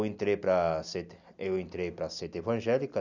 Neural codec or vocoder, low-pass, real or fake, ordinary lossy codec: none; 7.2 kHz; real; none